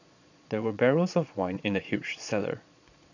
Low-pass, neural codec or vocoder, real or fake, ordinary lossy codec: 7.2 kHz; vocoder, 22.05 kHz, 80 mel bands, Vocos; fake; none